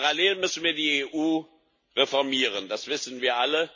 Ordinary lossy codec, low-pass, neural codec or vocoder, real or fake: MP3, 32 kbps; 7.2 kHz; none; real